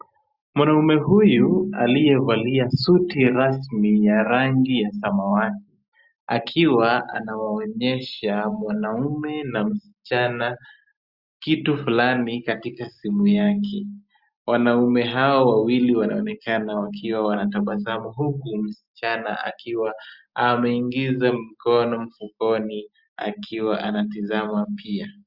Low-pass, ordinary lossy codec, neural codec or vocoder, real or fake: 5.4 kHz; Opus, 64 kbps; none; real